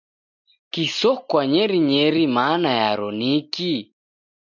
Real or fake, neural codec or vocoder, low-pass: real; none; 7.2 kHz